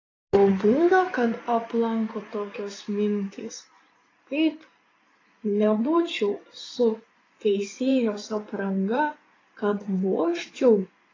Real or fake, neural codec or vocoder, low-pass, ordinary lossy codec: fake; codec, 16 kHz in and 24 kHz out, 2.2 kbps, FireRedTTS-2 codec; 7.2 kHz; AAC, 32 kbps